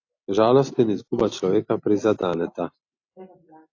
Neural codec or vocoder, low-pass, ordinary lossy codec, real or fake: none; 7.2 kHz; AAC, 48 kbps; real